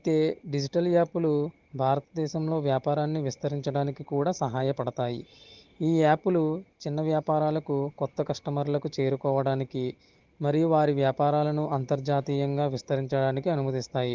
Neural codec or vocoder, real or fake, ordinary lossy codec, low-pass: none; real; Opus, 16 kbps; 7.2 kHz